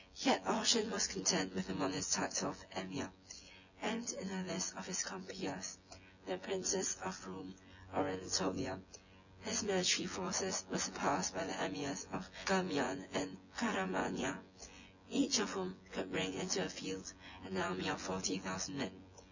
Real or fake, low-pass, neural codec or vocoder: fake; 7.2 kHz; vocoder, 24 kHz, 100 mel bands, Vocos